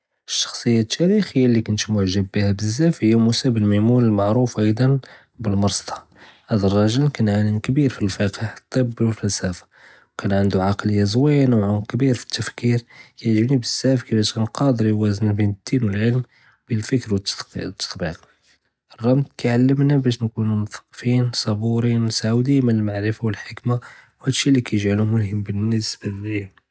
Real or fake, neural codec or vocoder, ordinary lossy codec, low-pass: real; none; none; none